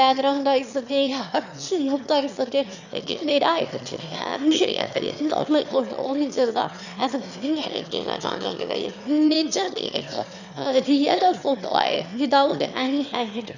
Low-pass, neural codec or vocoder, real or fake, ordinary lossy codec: 7.2 kHz; autoencoder, 22.05 kHz, a latent of 192 numbers a frame, VITS, trained on one speaker; fake; none